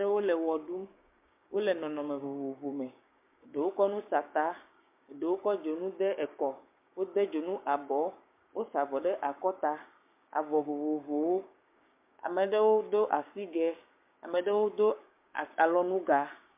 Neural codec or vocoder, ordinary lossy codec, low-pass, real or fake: codec, 16 kHz, 6 kbps, DAC; MP3, 32 kbps; 3.6 kHz; fake